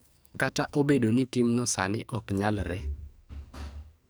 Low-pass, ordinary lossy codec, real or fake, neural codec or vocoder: none; none; fake; codec, 44.1 kHz, 2.6 kbps, SNAC